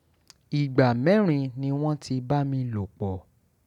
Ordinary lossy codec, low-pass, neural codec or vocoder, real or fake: none; 19.8 kHz; none; real